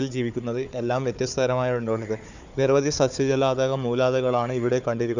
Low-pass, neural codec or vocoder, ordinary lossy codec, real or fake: 7.2 kHz; codec, 16 kHz, 4 kbps, FunCodec, trained on Chinese and English, 50 frames a second; none; fake